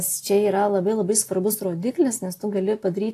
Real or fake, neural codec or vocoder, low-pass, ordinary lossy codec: real; none; 14.4 kHz; AAC, 48 kbps